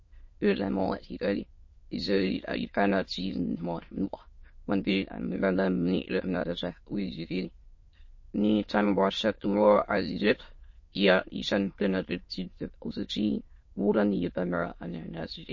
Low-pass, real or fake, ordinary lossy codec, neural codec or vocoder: 7.2 kHz; fake; MP3, 32 kbps; autoencoder, 22.05 kHz, a latent of 192 numbers a frame, VITS, trained on many speakers